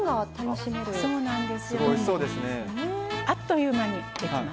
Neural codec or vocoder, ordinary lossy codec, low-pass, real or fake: none; none; none; real